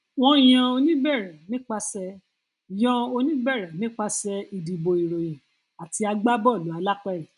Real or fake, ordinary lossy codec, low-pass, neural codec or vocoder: real; none; 10.8 kHz; none